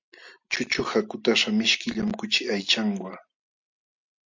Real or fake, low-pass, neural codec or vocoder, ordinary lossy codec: real; 7.2 kHz; none; MP3, 64 kbps